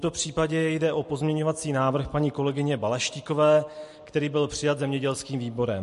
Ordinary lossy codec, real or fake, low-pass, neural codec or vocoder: MP3, 48 kbps; real; 14.4 kHz; none